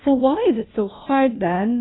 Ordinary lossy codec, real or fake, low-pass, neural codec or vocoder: AAC, 16 kbps; fake; 7.2 kHz; codec, 16 kHz, 1 kbps, FunCodec, trained on LibriTTS, 50 frames a second